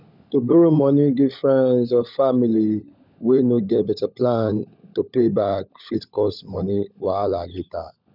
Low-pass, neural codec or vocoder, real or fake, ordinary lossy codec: 5.4 kHz; codec, 16 kHz, 16 kbps, FunCodec, trained on LibriTTS, 50 frames a second; fake; none